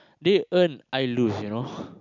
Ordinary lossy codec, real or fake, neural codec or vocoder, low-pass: none; real; none; 7.2 kHz